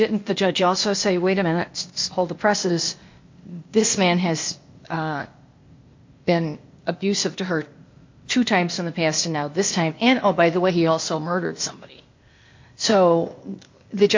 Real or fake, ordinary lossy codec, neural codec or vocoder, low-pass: fake; MP3, 48 kbps; codec, 16 kHz, 0.8 kbps, ZipCodec; 7.2 kHz